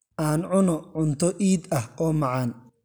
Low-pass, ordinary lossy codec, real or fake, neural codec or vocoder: none; none; real; none